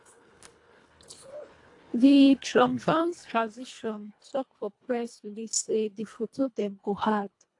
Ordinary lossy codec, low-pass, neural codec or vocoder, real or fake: AAC, 48 kbps; 10.8 kHz; codec, 24 kHz, 1.5 kbps, HILCodec; fake